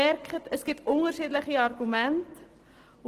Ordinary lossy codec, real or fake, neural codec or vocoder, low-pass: Opus, 16 kbps; real; none; 14.4 kHz